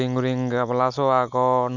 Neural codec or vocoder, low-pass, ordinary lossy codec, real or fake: none; 7.2 kHz; none; real